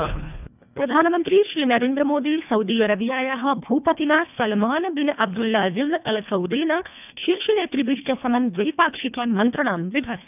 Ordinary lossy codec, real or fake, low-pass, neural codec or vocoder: none; fake; 3.6 kHz; codec, 24 kHz, 1.5 kbps, HILCodec